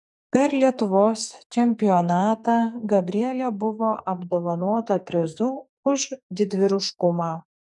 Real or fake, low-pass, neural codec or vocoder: fake; 10.8 kHz; codec, 44.1 kHz, 2.6 kbps, SNAC